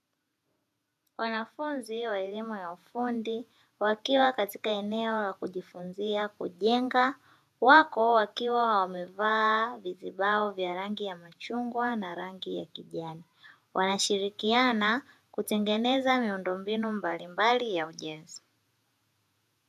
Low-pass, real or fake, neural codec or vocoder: 14.4 kHz; fake; vocoder, 48 kHz, 128 mel bands, Vocos